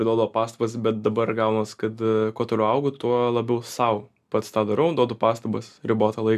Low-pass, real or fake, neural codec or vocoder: 14.4 kHz; real; none